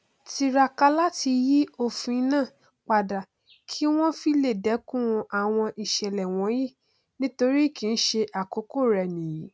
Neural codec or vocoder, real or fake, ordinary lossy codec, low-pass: none; real; none; none